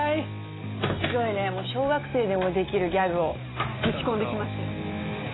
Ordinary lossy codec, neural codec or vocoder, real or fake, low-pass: AAC, 16 kbps; none; real; 7.2 kHz